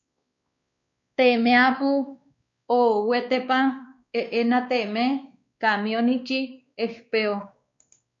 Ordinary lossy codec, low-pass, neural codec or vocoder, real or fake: MP3, 48 kbps; 7.2 kHz; codec, 16 kHz, 2 kbps, X-Codec, WavLM features, trained on Multilingual LibriSpeech; fake